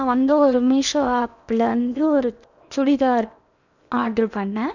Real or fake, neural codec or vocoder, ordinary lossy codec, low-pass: fake; codec, 16 kHz in and 24 kHz out, 0.8 kbps, FocalCodec, streaming, 65536 codes; none; 7.2 kHz